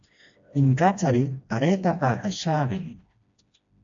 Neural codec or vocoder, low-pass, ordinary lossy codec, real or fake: codec, 16 kHz, 1 kbps, FreqCodec, smaller model; 7.2 kHz; AAC, 64 kbps; fake